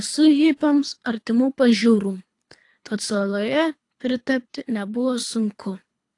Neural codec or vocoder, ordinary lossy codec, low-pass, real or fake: codec, 24 kHz, 3 kbps, HILCodec; AAC, 48 kbps; 10.8 kHz; fake